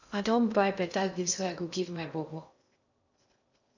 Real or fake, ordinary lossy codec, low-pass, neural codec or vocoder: fake; none; 7.2 kHz; codec, 16 kHz in and 24 kHz out, 0.6 kbps, FocalCodec, streaming, 2048 codes